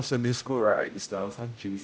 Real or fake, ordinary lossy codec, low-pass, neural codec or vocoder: fake; none; none; codec, 16 kHz, 0.5 kbps, X-Codec, HuBERT features, trained on general audio